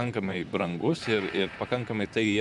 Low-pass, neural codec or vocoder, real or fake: 10.8 kHz; vocoder, 44.1 kHz, 128 mel bands, Pupu-Vocoder; fake